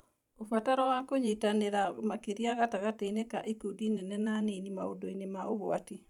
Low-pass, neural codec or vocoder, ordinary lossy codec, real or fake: 19.8 kHz; vocoder, 44.1 kHz, 128 mel bands every 512 samples, BigVGAN v2; none; fake